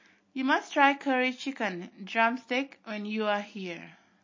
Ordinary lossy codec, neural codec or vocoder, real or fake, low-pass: MP3, 32 kbps; none; real; 7.2 kHz